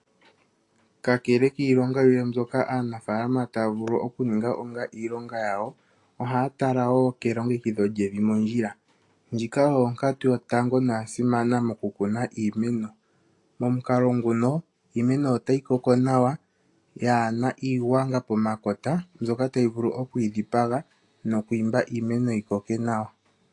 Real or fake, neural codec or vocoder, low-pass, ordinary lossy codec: real; none; 10.8 kHz; AAC, 48 kbps